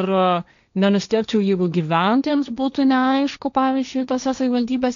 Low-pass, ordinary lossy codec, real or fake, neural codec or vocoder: 7.2 kHz; MP3, 96 kbps; fake; codec, 16 kHz, 1.1 kbps, Voila-Tokenizer